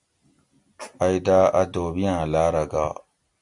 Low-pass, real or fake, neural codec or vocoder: 10.8 kHz; real; none